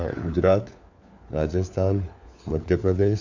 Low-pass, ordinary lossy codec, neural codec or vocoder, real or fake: 7.2 kHz; none; codec, 16 kHz, 4 kbps, FunCodec, trained on LibriTTS, 50 frames a second; fake